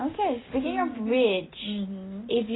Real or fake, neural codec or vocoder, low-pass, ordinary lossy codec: real; none; 7.2 kHz; AAC, 16 kbps